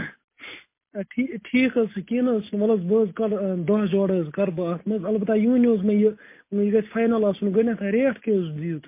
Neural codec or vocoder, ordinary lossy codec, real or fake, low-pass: none; MP3, 24 kbps; real; 3.6 kHz